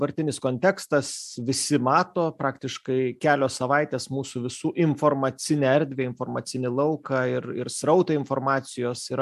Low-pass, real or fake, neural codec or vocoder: 14.4 kHz; real; none